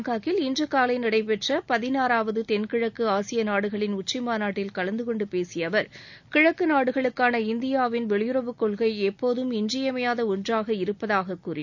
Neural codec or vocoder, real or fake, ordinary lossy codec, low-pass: none; real; none; 7.2 kHz